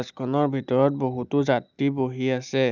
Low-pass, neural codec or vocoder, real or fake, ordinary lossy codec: 7.2 kHz; none; real; none